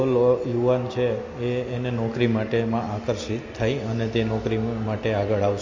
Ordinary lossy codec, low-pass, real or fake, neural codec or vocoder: MP3, 32 kbps; 7.2 kHz; real; none